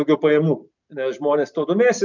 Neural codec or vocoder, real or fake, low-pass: none; real; 7.2 kHz